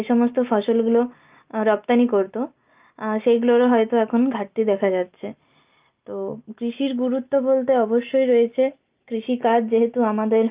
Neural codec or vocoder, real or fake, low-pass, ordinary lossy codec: none; real; 3.6 kHz; Opus, 24 kbps